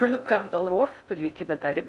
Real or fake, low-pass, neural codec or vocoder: fake; 10.8 kHz; codec, 16 kHz in and 24 kHz out, 0.6 kbps, FocalCodec, streaming, 4096 codes